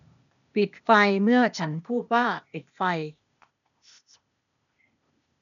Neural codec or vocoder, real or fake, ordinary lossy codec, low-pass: codec, 16 kHz, 0.8 kbps, ZipCodec; fake; none; 7.2 kHz